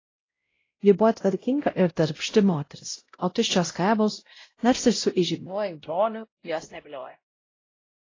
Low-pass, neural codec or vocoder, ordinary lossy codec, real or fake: 7.2 kHz; codec, 16 kHz, 0.5 kbps, X-Codec, WavLM features, trained on Multilingual LibriSpeech; AAC, 32 kbps; fake